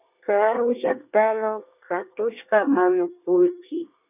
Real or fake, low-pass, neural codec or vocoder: fake; 3.6 kHz; codec, 24 kHz, 1 kbps, SNAC